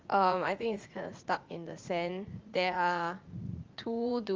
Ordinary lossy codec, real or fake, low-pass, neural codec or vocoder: Opus, 32 kbps; fake; 7.2 kHz; vocoder, 44.1 kHz, 80 mel bands, Vocos